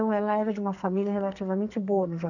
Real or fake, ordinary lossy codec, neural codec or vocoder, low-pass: fake; none; codec, 44.1 kHz, 2.6 kbps, SNAC; 7.2 kHz